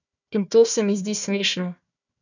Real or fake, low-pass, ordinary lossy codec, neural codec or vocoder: fake; 7.2 kHz; none; codec, 16 kHz, 1 kbps, FunCodec, trained on Chinese and English, 50 frames a second